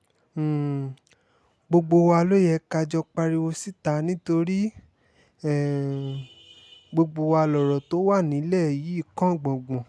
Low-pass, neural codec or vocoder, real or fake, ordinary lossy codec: none; none; real; none